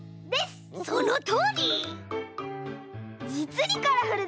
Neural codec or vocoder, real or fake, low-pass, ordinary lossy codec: none; real; none; none